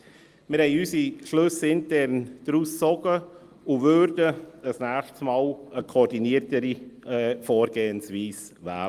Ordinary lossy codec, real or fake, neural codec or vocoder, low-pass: Opus, 32 kbps; real; none; 14.4 kHz